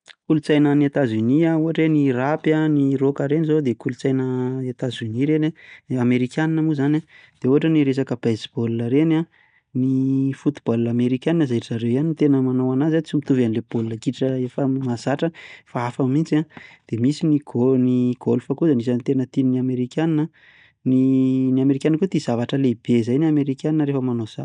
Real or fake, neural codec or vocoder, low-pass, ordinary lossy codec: real; none; 9.9 kHz; none